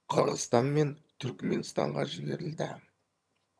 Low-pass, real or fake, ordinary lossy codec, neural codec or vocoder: none; fake; none; vocoder, 22.05 kHz, 80 mel bands, HiFi-GAN